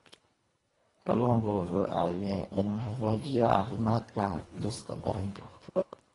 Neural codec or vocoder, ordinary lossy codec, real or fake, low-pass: codec, 24 kHz, 1.5 kbps, HILCodec; AAC, 32 kbps; fake; 10.8 kHz